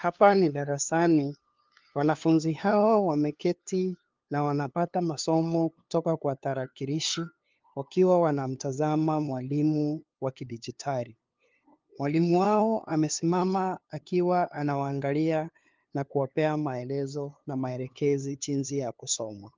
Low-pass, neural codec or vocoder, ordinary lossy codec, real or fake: 7.2 kHz; codec, 16 kHz, 2 kbps, FunCodec, trained on LibriTTS, 25 frames a second; Opus, 32 kbps; fake